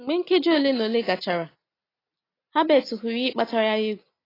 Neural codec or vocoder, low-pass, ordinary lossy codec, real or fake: none; 5.4 kHz; AAC, 24 kbps; real